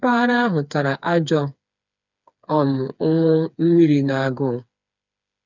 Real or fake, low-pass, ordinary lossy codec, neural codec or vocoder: fake; 7.2 kHz; none; codec, 16 kHz, 4 kbps, FreqCodec, smaller model